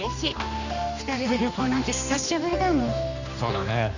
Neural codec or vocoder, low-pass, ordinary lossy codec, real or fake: codec, 16 kHz, 2 kbps, X-Codec, HuBERT features, trained on general audio; 7.2 kHz; none; fake